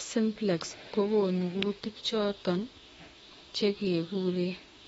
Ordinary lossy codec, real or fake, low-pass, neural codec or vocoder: AAC, 24 kbps; fake; 19.8 kHz; autoencoder, 48 kHz, 32 numbers a frame, DAC-VAE, trained on Japanese speech